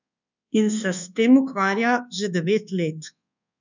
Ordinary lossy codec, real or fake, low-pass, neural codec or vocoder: none; fake; 7.2 kHz; codec, 24 kHz, 1.2 kbps, DualCodec